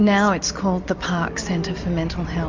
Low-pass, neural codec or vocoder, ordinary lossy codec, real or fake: 7.2 kHz; none; MP3, 48 kbps; real